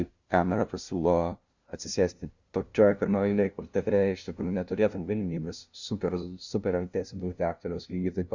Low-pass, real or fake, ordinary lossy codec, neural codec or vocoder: 7.2 kHz; fake; Opus, 64 kbps; codec, 16 kHz, 0.5 kbps, FunCodec, trained on LibriTTS, 25 frames a second